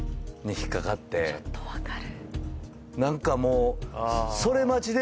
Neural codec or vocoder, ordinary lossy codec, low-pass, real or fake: none; none; none; real